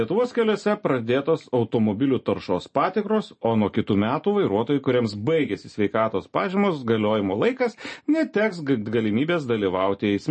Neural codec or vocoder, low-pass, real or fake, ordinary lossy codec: none; 10.8 kHz; real; MP3, 32 kbps